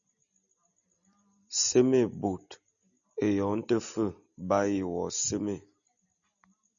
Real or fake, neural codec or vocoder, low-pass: real; none; 7.2 kHz